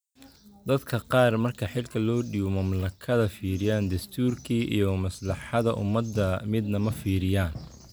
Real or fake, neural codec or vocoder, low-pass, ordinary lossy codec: real; none; none; none